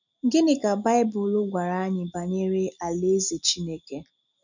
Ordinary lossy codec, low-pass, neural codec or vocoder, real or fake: none; 7.2 kHz; none; real